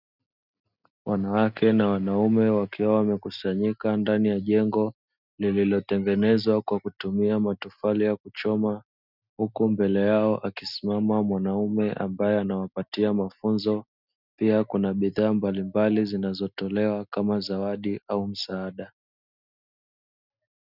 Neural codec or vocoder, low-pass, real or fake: none; 5.4 kHz; real